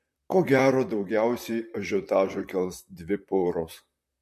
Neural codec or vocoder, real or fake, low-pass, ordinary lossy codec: vocoder, 44.1 kHz, 128 mel bands, Pupu-Vocoder; fake; 14.4 kHz; MP3, 64 kbps